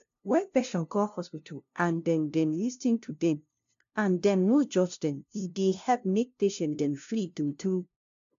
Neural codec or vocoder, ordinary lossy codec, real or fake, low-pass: codec, 16 kHz, 0.5 kbps, FunCodec, trained on LibriTTS, 25 frames a second; AAC, 64 kbps; fake; 7.2 kHz